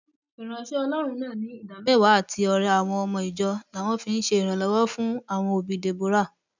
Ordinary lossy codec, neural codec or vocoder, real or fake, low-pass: none; none; real; 7.2 kHz